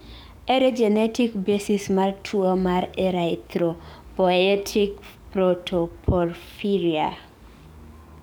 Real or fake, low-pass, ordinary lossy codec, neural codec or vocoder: fake; none; none; codec, 44.1 kHz, 7.8 kbps, DAC